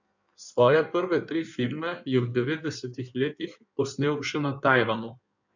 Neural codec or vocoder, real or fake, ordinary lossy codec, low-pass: codec, 16 kHz in and 24 kHz out, 1.1 kbps, FireRedTTS-2 codec; fake; none; 7.2 kHz